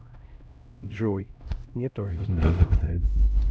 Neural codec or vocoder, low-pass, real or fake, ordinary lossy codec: codec, 16 kHz, 0.5 kbps, X-Codec, HuBERT features, trained on LibriSpeech; none; fake; none